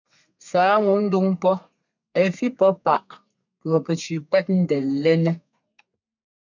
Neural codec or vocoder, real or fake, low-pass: codec, 32 kHz, 1.9 kbps, SNAC; fake; 7.2 kHz